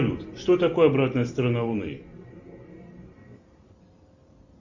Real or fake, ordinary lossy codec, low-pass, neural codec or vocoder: real; Opus, 64 kbps; 7.2 kHz; none